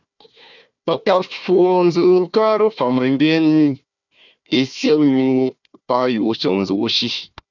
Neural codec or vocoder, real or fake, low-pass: codec, 16 kHz, 1 kbps, FunCodec, trained on Chinese and English, 50 frames a second; fake; 7.2 kHz